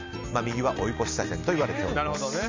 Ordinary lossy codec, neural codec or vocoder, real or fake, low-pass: MP3, 64 kbps; none; real; 7.2 kHz